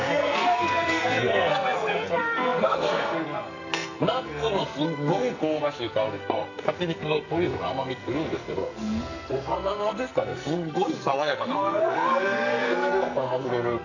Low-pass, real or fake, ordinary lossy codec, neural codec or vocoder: 7.2 kHz; fake; none; codec, 44.1 kHz, 2.6 kbps, SNAC